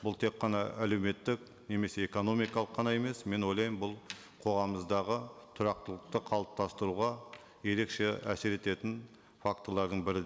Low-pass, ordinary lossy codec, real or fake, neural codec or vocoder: none; none; real; none